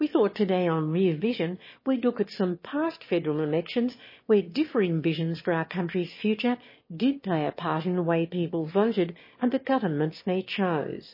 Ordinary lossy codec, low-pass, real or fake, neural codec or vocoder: MP3, 24 kbps; 5.4 kHz; fake; autoencoder, 22.05 kHz, a latent of 192 numbers a frame, VITS, trained on one speaker